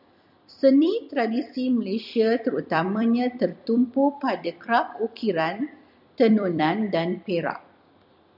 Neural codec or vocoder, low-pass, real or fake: vocoder, 44.1 kHz, 128 mel bands every 256 samples, BigVGAN v2; 5.4 kHz; fake